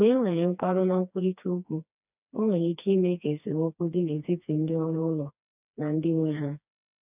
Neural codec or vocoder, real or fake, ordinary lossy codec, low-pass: codec, 16 kHz, 2 kbps, FreqCodec, smaller model; fake; none; 3.6 kHz